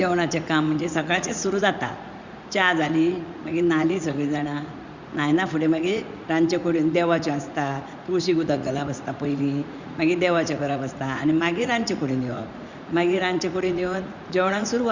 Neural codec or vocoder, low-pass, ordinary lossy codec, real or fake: vocoder, 44.1 kHz, 80 mel bands, Vocos; 7.2 kHz; Opus, 64 kbps; fake